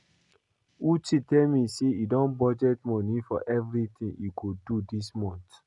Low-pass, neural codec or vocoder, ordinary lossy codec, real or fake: 10.8 kHz; none; none; real